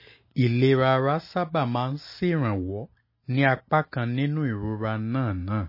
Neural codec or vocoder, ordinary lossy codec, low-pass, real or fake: none; MP3, 24 kbps; 5.4 kHz; real